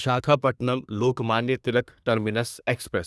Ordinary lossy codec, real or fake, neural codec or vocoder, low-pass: none; fake; codec, 24 kHz, 1 kbps, SNAC; none